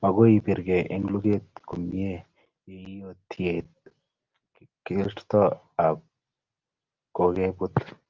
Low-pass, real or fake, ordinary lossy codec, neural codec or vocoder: 7.2 kHz; real; Opus, 32 kbps; none